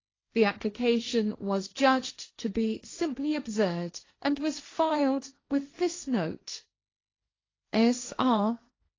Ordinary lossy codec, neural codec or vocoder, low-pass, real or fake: AAC, 32 kbps; codec, 16 kHz, 1.1 kbps, Voila-Tokenizer; 7.2 kHz; fake